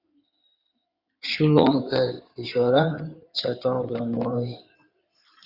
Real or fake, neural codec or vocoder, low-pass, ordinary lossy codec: fake; codec, 16 kHz in and 24 kHz out, 2.2 kbps, FireRedTTS-2 codec; 5.4 kHz; Opus, 64 kbps